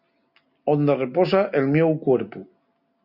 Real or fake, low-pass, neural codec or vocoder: real; 5.4 kHz; none